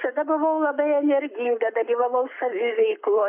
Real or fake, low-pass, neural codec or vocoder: fake; 3.6 kHz; codec, 44.1 kHz, 7.8 kbps, Pupu-Codec